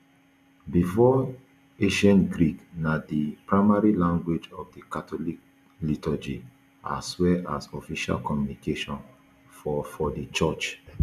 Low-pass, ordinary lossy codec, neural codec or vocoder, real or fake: 14.4 kHz; none; none; real